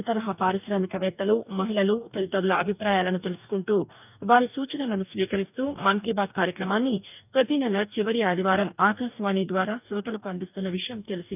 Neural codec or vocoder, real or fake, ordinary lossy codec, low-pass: codec, 44.1 kHz, 2.6 kbps, DAC; fake; none; 3.6 kHz